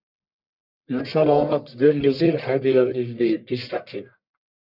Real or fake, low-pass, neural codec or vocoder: fake; 5.4 kHz; codec, 44.1 kHz, 1.7 kbps, Pupu-Codec